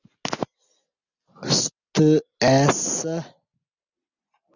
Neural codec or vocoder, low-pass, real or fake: none; 7.2 kHz; real